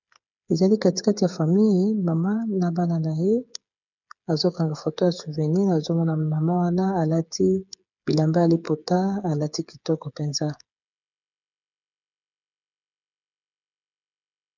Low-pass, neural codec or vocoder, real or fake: 7.2 kHz; codec, 16 kHz, 8 kbps, FreqCodec, smaller model; fake